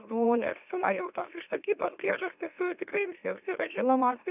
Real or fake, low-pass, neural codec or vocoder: fake; 3.6 kHz; autoencoder, 44.1 kHz, a latent of 192 numbers a frame, MeloTTS